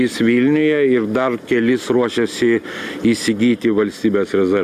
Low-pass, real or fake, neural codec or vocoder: 14.4 kHz; real; none